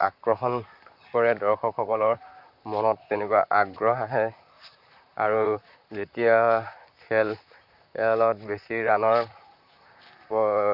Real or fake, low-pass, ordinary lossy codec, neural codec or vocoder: fake; 5.4 kHz; none; vocoder, 44.1 kHz, 128 mel bands, Pupu-Vocoder